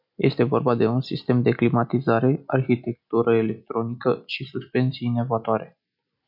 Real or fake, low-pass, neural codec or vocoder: real; 5.4 kHz; none